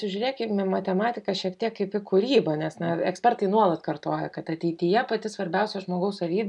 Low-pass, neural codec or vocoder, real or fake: 10.8 kHz; none; real